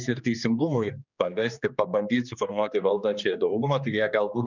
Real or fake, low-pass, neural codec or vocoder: fake; 7.2 kHz; codec, 16 kHz, 2 kbps, X-Codec, HuBERT features, trained on general audio